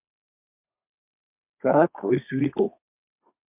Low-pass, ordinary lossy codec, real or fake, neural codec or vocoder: 3.6 kHz; MP3, 32 kbps; fake; codec, 32 kHz, 1.9 kbps, SNAC